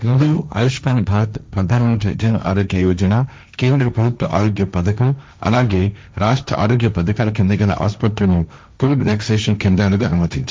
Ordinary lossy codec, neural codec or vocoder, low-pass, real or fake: none; codec, 16 kHz, 1.1 kbps, Voila-Tokenizer; none; fake